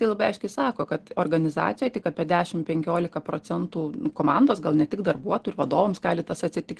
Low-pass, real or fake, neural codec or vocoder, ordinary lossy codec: 10.8 kHz; real; none; Opus, 16 kbps